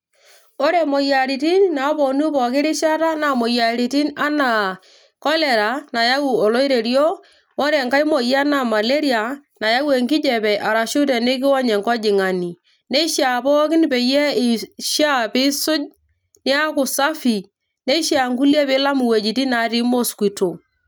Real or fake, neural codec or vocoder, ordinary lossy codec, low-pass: real; none; none; none